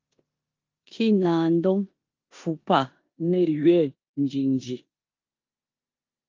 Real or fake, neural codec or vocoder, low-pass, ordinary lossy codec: fake; codec, 16 kHz in and 24 kHz out, 0.9 kbps, LongCat-Audio-Codec, four codebook decoder; 7.2 kHz; Opus, 24 kbps